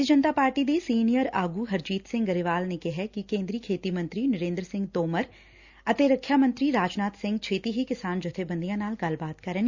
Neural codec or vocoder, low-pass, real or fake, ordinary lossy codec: none; 7.2 kHz; real; Opus, 64 kbps